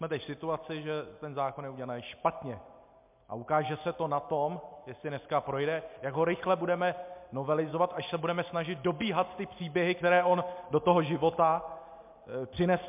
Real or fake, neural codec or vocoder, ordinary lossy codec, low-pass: real; none; MP3, 32 kbps; 3.6 kHz